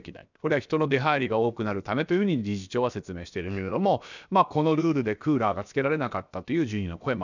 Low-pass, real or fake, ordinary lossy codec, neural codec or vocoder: 7.2 kHz; fake; none; codec, 16 kHz, about 1 kbps, DyCAST, with the encoder's durations